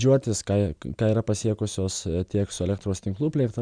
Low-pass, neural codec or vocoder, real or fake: 9.9 kHz; none; real